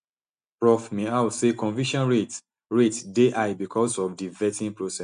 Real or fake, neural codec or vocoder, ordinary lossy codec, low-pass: real; none; MP3, 64 kbps; 9.9 kHz